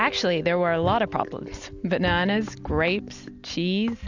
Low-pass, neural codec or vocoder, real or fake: 7.2 kHz; none; real